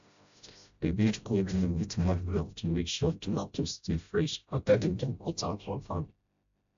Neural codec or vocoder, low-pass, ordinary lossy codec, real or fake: codec, 16 kHz, 0.5 kbps, FreqCodec, smaller model; 7.2 kHz; AAC, 64 kbps; fake